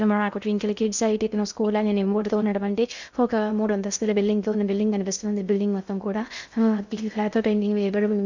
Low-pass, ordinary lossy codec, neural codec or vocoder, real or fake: 7.2 kHz; none; codec, 16 kHz in and 24 kHz out, 0.6 kbps, FocalCodec, streaming, 2048 codes; fake